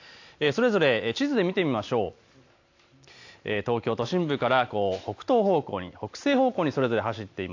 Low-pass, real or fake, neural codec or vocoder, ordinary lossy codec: 7.2 kHz; real; none; AAC, 48 kbps